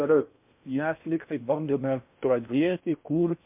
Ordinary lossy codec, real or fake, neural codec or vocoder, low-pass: MP3, 32 kbps; fake; codec, 16 kHz in and 24 kHz out, 0.6 kbps, FocalCodec, streaming, 4096 codes; 3.6 kHz